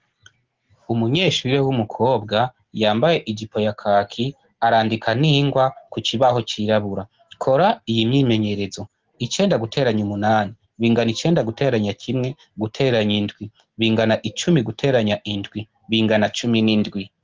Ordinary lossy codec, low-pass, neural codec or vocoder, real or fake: Opus, 16 kbps; 7.2 kHz; none; real